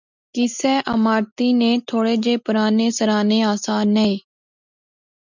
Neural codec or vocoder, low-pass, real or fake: none; 7.2 kHz; real